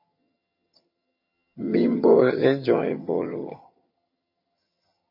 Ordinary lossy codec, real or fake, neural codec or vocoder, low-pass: MP3, 24 kbps; fake; vocoder, 22.05 kHz, 80 mel bands, HiFi-GAN; 5.4 kHz